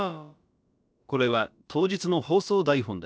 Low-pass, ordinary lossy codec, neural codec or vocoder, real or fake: none; none; codec, 16 kHz, about 1 kbps, DyCAST, with the encoder's durations; fake